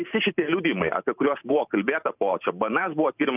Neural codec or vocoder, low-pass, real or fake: vocoder, 44.1 kHz, 128 mel bands every 512 samples, BigVGAN v2; 3.6 kHz; fake